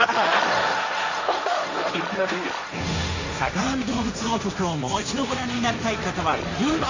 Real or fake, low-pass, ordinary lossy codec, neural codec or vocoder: fake; 7.2 kHz; Opus, 64 kbps; codec, 16 kHz, 1.1 kbps, Voila-Tokenizer